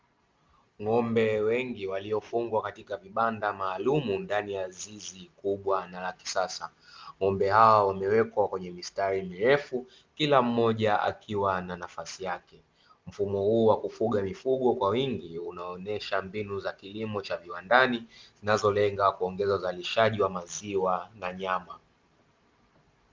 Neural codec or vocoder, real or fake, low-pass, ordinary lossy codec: none; real; 7.2 kHz; Opus, 32 kbps